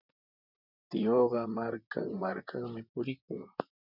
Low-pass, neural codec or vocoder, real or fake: 5.4 kHz; vocoder, 44.1 kHz, 128 mel bands, Pupu-Vocoder; fake